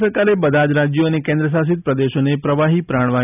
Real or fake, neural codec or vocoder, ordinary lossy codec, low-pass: real; none; none; 3.6 kHz